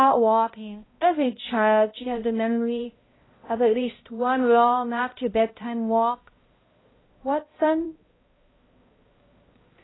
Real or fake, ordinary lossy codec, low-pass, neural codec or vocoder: fake; AAC, 16 kbps; 7.2 kHz; codec, 16 kHz, 0.5 kbps, X-Codec, HuBERT features, trained on balanced general audio